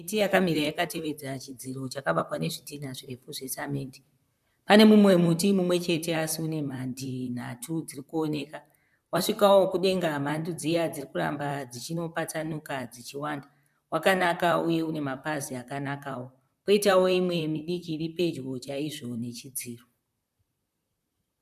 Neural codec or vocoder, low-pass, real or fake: vocoder, 44.1 kHz, 128 mel bands, Pupu-Vocoder; 14.4 kHz; fake